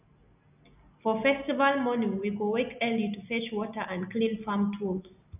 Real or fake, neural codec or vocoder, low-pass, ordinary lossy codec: real; none; 3.6 kHz; none